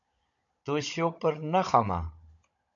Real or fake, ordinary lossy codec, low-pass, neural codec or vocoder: fake; AAC, 64 kbps; 7.2 kHz; codec, 16 kHz, 16 kbps, FunCodec, trained on Chinese and English, 50 frames a second